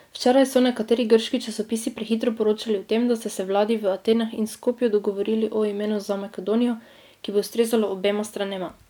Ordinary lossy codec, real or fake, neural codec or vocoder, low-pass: none; real; none; none